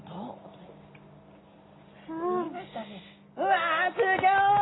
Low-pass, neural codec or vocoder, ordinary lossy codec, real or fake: 7.2 kHz; none; AAC, 16 kbps; real